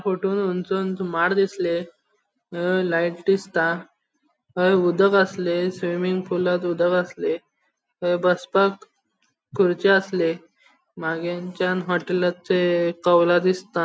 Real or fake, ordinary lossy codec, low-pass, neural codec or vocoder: real; none; none; none